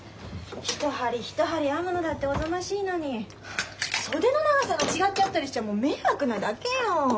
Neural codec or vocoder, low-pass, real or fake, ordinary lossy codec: none; none; real; none